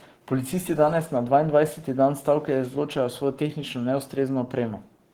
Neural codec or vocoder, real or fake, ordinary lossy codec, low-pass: codec, 44.1 kHz, 7.8 kbps, Pupu-Codec; fake; Opus, 16 kbps; 19.8 kHz